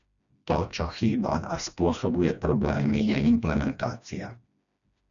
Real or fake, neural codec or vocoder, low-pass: fake; codec, 16 kHz, 1 kbps, FreqCodec, smaller model; 7.2 kHz